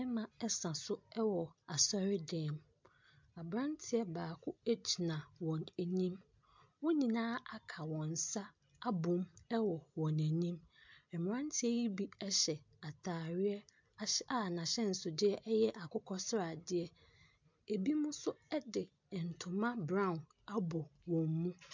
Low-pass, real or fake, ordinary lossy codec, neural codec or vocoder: 7.2 kHz; real; MP3, 64 kbps; none